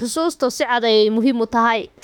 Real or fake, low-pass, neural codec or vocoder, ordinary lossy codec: fake; 19.8 kHz; autoencoder, 48 kHz, 32 numbers a frame, DAC-VAE, trained on Japanese speech; none